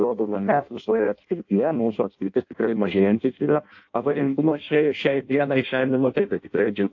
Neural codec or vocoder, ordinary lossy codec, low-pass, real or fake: codec, 16 kHz in and 24 kHz out, 0.6 kbps, FireRedTTS-2 codec; AAC, 48 kbps; 7.2 kHz; fake